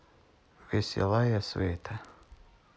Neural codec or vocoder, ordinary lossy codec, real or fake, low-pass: none; none; real; none